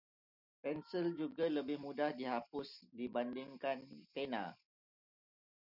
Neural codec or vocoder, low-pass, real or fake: none; 5.4 kHz; real